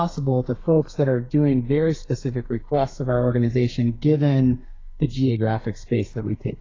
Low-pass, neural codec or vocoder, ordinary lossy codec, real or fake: 7.2 kHz; codec, 32 kHz, 1.9 kbps, SNAC; AAC, 32 kbps; fake